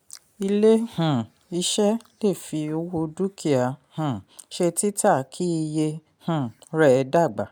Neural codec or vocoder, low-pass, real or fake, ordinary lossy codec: none; none; real; none